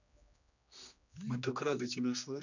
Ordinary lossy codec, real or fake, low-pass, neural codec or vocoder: none; fake; 7.2 kHz; codec, 16 kHz, 2 kbps, X-Codec, HuBERT features, trained on general audio